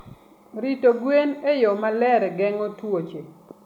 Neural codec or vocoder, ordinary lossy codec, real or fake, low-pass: none; MP3, 96 kbps; real; 19.8 kHz